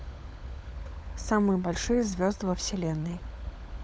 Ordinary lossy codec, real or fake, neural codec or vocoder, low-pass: none; fake; codec, 16 kHz, 16 kbps, FunCodec, trained on LibriTTS, 50 frames a second; none